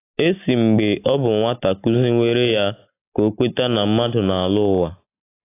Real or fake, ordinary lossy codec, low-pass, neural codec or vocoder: real; AAC, 24 kbps; 3.6 kHz; none